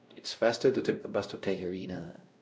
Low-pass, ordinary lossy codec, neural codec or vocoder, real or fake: none; none; codec, 16 kHz, 0.5 kbps, X-Codec, WavLM features, trained on Multilingual LibriSpeech; fake